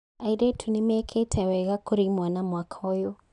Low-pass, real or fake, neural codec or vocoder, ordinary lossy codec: 10.8 kHz; real; none; none